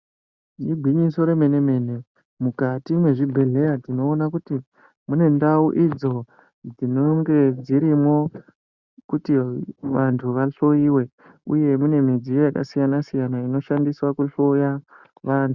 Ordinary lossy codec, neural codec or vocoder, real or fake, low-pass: Opus, 64 kbps; none; real; 7.2 kHz